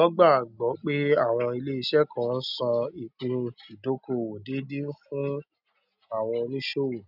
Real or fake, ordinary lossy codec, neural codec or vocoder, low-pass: real; none; none; 5.4 kHz